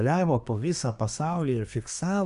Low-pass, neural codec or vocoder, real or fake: 10.8 kHz; codec, 24 kHz, 1 kbps, SNAC; fake